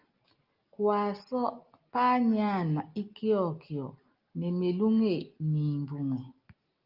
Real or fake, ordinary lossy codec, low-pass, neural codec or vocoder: real; Opus, 32 kbps; 5.4 kHz; none